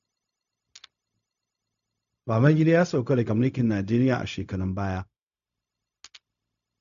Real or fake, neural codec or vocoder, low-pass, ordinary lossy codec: fake; codec, 16 kHz, 0.4 kbps, LongCat-Audio-Codec; 7.2 kHz; none